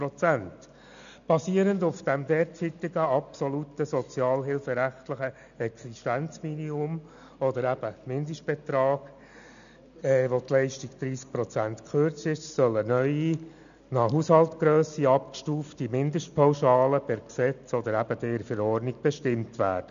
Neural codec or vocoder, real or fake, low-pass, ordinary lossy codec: none; real; 7.2 kHz; none